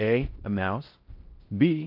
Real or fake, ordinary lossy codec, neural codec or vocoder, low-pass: fake; Opus, 32 kbps; codec, 16 kHz in and 24 kHz out, 0.6 kbps, FocalCodec, streaming, 4096 codes; 5.4 kHz